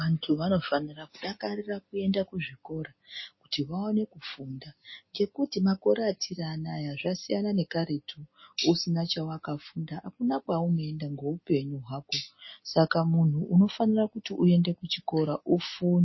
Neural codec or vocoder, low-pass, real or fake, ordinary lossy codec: none; 7.2 kHz; real; MP3, 24 kbps